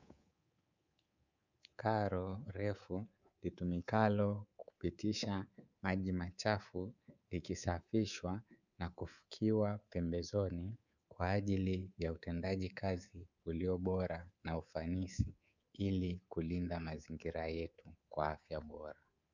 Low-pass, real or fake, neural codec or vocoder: 7.2 kHz; fake; codec, 24 kHz, 3.1 kbps, DualCodec